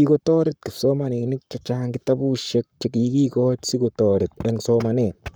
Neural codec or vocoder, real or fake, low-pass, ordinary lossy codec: codec, 44.1 kHz, 7.8 kbps, Pupu-Codec; fake; none; none